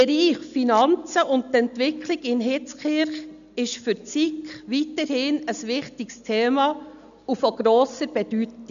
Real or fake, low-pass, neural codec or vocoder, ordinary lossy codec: real; 7.2 kHz; none; none